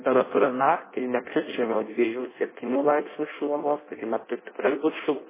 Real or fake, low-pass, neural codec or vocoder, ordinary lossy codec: fake; 3.6 kHz; codec, 16 kHz in and 24 kHz out, 0.6 kbps, FireRedTTS-2 codec; MP3, 16 kbps